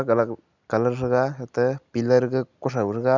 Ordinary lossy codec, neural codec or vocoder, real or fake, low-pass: none; none; real; 7.2 kHz